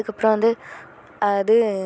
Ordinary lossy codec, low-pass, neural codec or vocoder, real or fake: none; none; none; real